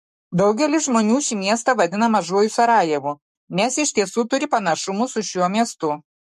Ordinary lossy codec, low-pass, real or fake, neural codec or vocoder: MP3, 64 kbps; 14.4 kHz; fake; codec, 44.1 kHz, 7.8 kbps, Pupu-Codec